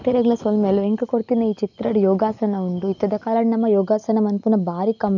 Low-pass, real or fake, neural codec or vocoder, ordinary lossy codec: 7.2 kHz; real; none; none